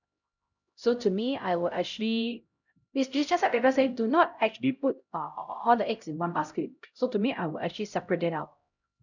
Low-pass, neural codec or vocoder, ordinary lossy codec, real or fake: 7.2 kHz; codec, 16 kHz, 0.5 kbps, X-Codec, HuBERT features, trained on LibriSpeech; none; fake